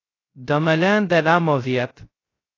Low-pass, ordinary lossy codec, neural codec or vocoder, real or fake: 7.2 kHz; AAC, 32 kbps; codec, 16 kHz, 0.2 kbps, FocalCodec; fake